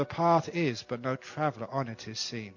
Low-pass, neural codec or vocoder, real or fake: 7.2 kHz; none; real